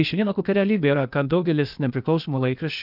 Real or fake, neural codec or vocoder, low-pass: fake; codec, 16 kHz, 0.8 kbps, ZipCodec; 5.4 kHz